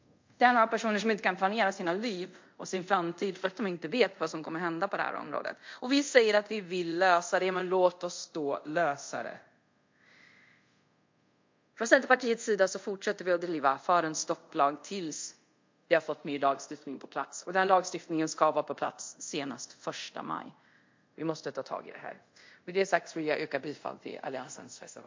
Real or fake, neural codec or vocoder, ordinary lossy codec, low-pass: fake; codec, 24 kHz, 0.5 kbps, DualCodec; MP3, 48 kbps; 7.2 kHz